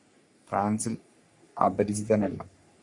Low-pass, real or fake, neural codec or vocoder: 10.8 kHz; fake; codec, 44.1 kHz, 3.4 kbps, Pupu-Codec